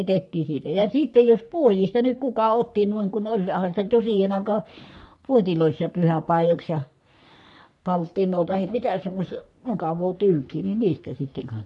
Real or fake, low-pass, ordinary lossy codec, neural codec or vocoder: fake; 10.8 kHz; none; codec, 44.1 kHz, 3.4 kbps, Pupu-Codec